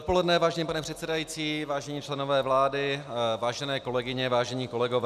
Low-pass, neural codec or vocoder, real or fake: 14.4 kHz; none; real